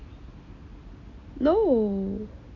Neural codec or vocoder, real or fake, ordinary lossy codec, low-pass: none; real; none; 7.2 kHz